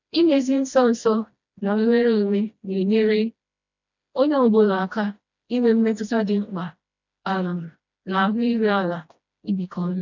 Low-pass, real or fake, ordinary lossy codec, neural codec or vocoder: 7.2 kHz; fake; none; codec, 16 kHz, 1 kbps, FreqCodec, smaller model